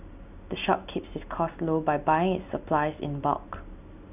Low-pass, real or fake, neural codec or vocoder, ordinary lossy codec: 3.6 kHz; real; none; none